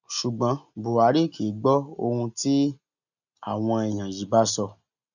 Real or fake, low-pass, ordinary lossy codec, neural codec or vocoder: real; 7.2 kHz; none; none